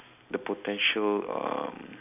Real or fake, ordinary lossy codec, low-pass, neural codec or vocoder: real; none; 3.6 kHz; none